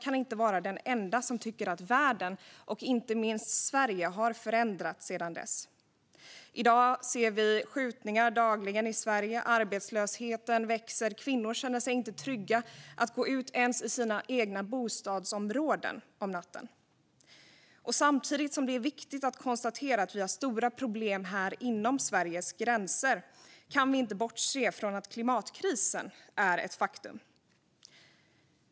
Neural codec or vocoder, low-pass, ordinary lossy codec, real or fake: none; none; none; real